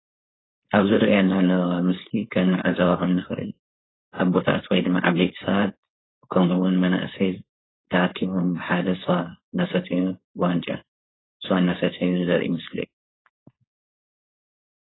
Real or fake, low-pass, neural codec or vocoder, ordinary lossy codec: fake; 7.2 kHz; codec, 16 kHz, 4.8 kbps, FACodec; AAC, 16 kbps